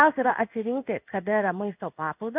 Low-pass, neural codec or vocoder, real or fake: 3.6 kHz; codec, 16 kHz in and 24 kHz out, 1 kbps, XY-Tokenizer; fake